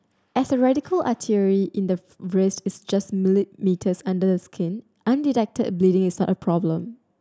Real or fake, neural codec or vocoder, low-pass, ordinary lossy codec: real; none; none; none